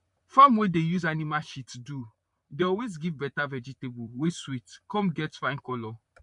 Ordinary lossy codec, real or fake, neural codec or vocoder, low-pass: none; fake; vocoder, 44.1 kHz, 128 mel bands, Pupu-Vocoder; 10.8 kHz